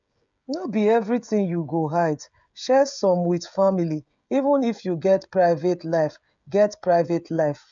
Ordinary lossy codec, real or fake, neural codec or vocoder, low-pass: MP3, 64 kbps; fake; codec, 16 kHz, 16 kbps, FreqCodec, smaller model; 7.2 kHz